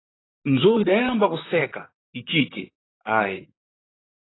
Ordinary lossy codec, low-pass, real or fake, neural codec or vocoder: AAC, 16 kbps; 7.2 kHz; fake; vocoder, 44.1 kHz, 128 mel bands, Pupu-Vocoder